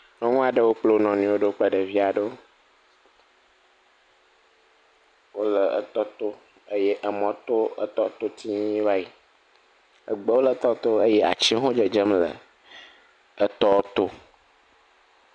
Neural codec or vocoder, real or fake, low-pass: none; real; 9.9 kHz